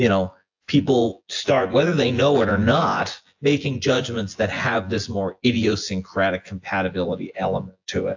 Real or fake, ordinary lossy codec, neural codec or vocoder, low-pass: fake; AAC, 48 kbps; vocoder, 24 kHz, 100 mel bands, Vocos; 7.2 kHz